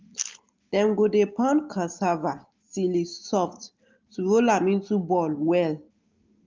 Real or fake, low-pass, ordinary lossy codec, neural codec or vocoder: real; 7.2 kHz; Opus, 32 kbps; none